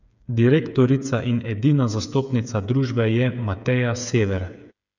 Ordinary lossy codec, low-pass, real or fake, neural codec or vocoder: none; 7.2 kHz; fake; codec, 16 kHz, 8 kbps, FreqCodec, smaller model